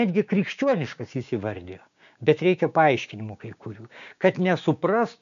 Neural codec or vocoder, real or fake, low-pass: codec, 16 kHz, 6 kbps, DAC; fake; 7.2 kHz